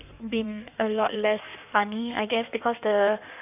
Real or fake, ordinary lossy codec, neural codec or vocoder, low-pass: fake; none; codec, 16 kHz in and 24 kHz out, 1.1 kbps, FireRedTTS-2 codec; 3.6 kHz